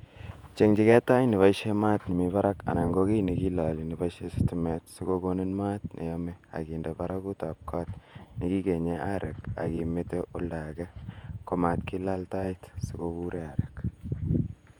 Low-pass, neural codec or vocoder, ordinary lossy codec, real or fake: 19.8 kHz; vocoder, 48 kHz, 128 mel bands, Vocos; none; fake